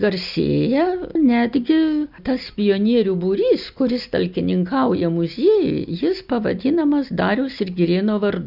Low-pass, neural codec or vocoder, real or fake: 5.4 kHz; none; real